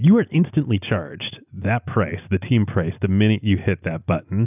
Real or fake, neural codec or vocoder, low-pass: real; none; 3.6 kHz